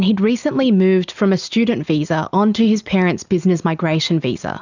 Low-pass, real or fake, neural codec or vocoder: 7.2 kHz; real; none